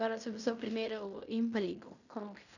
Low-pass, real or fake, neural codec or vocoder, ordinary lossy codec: 7.2 kHz; fake; codec, 16 kHz in and 24 kHz out, 0.9 kbps, LongCat-Audio-Codec, fine tuned four codebook decoder; none